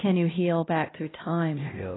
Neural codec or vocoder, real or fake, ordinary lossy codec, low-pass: codec, 16 kHz, 1 kbps, X-Codec, HuBERT features, trained on LibriSpeech; fake; AAC, 16 kbps; 7.2 kHz